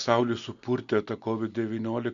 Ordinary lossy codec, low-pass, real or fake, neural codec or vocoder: Opus, 64 kbps; 7.2 kHz; real; none